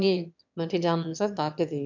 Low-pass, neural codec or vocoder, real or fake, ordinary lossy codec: 7.2 kHz; autoencoder, 22.05 kHz, a latent of 192 numbers a frame, VITS, trained on one speaker; fake; none